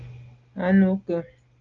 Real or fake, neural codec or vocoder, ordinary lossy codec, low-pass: real; none; Opus, 32 kbps; 7.2 kHz